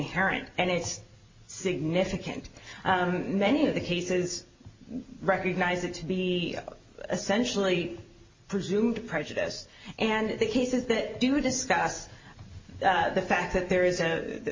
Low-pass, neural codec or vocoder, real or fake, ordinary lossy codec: 7.2 kHz; none; real; MP3, 32 kbps